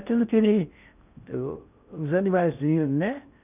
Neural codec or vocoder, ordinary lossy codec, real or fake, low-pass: codec, 16 kHz in and 24 kHz out, 0.6 kbps, FocalCodec, streaming, 4096 codes; none; fake; 3.6 kHz